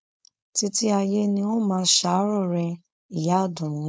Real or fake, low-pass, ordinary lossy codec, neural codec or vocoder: fake; none; none; codec, 16 kHz, 4.8 kbps, FACodec